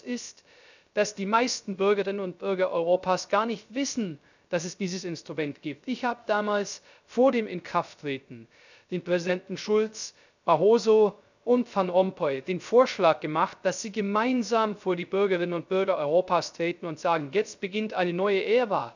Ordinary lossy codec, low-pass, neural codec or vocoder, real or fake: none; 7.2 kHz; codec, 16 kHz, 0.3 kbps, FocalCodec; fake